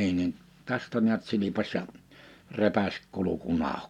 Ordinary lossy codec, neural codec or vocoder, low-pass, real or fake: MP3, 96 kbps; none; 19.8 kHz; real